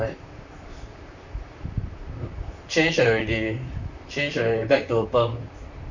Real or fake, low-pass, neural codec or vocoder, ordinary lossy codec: fake; 7.2 kHz; vocoder, 44.1 kHz, 128 mel bands, Pupu-Vocoder; none